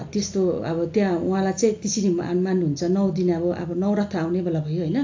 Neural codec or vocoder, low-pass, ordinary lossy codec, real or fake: none; 7.2 kHz; AAC, 48 kbps; real